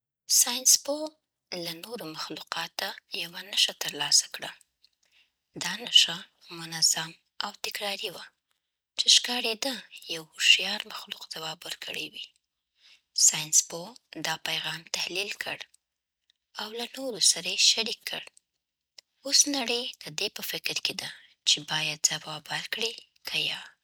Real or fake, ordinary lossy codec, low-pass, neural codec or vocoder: real; none; none; none